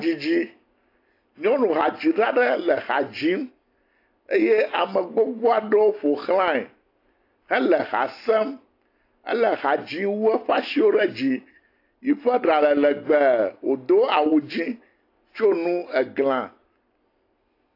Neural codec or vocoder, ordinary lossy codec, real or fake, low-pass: none; AAC, 32 kbps; real; 5.4 kHz